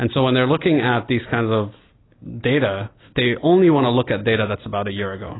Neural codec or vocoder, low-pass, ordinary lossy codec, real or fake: none; 7.2 kHz; AAC, 16 kbps; real